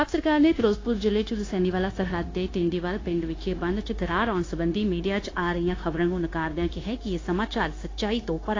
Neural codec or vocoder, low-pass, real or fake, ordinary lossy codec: codec, 16 kHz, 0.9 kbps, LongCat-Audio-Codec; 7.2 kHz; fake; AAC, 32 kbps